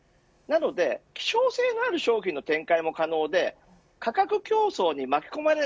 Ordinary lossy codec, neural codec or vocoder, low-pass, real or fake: none; none; none; real